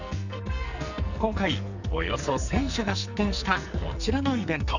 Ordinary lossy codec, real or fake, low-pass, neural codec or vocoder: none; fake; 7.2 kHz; codec, 44.1 kHz, 2.6 kbps, SNAC